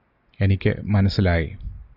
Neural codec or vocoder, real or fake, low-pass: none; real; 5.4 kHz